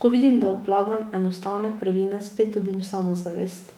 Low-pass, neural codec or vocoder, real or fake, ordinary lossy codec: 19.8 kHz; autoencoder, 48 kHz, 32 numbers a frame, DAC-VAE, trained on Japanese speech; fake; MP3, 96 kbps